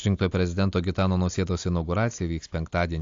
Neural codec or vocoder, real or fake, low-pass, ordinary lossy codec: none; real; 7.2 kHz; AAC, 48 kbps